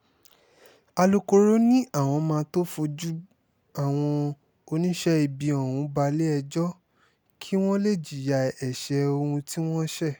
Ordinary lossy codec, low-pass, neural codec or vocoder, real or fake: none; none; none; real